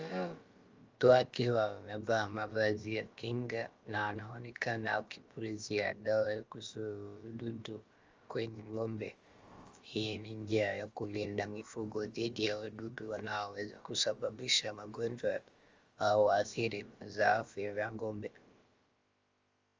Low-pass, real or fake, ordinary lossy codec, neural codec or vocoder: 7.2 kHz; fake; Opus, 32 kbps; codec, 16 kHz, about 1 kbps, DyCAST, with the encoder's durations